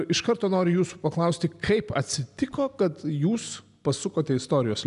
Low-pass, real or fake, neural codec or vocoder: 10.8 kHz; real; none